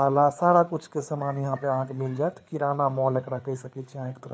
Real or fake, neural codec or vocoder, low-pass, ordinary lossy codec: fake; codec, 16 kHz, 4 kbps, FreqCodec, larger model; none; none